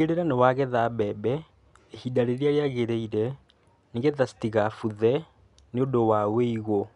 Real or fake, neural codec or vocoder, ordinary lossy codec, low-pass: real; none; none; 10.8 kHz